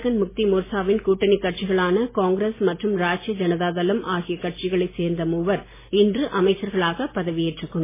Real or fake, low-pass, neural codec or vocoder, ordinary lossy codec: real; 3.6 kHz; none; MP3, 16 kbps